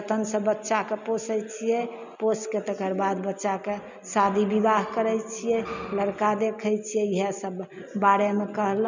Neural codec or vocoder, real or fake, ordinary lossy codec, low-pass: none; real; none; 7.2 kHz